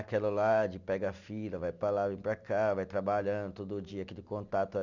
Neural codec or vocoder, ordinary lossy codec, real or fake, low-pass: none; none; real; 7.2 kHz